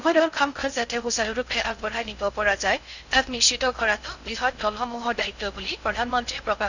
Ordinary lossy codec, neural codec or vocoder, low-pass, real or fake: none; codec, 16 kHz in and 24 kHz out, 0.6 kbps, FocalCodec, streaming, 4096 codes; 7.2 kHz; fake